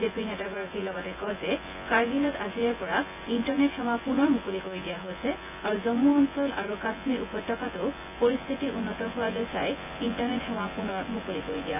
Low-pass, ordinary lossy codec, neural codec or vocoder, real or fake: 3.6 kHz; none; vocoder, 24 kHz, 100 mel bands, Vocos; fake